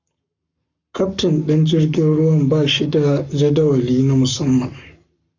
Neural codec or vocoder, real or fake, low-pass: codec, 44.1 kHz, 7.8 kbps, Pupu-Codec; fake; 7.2 kHz